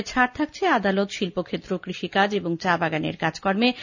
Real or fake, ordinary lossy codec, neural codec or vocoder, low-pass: real; none; none; 7.2 kHz